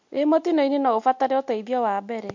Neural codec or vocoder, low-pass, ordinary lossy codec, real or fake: none; 7.2 kHz; MP3, 48 kbps; real